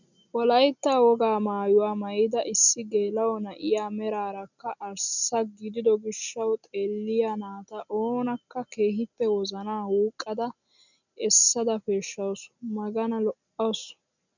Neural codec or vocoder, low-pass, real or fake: none; 7.2 kHz; real